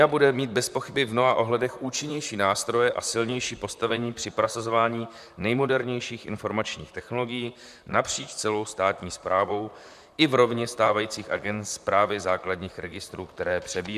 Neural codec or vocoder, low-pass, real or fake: vocoder, 44.1 kHz, 128 mel bands, Pupu-Vocoder; 14.4 kHz; fake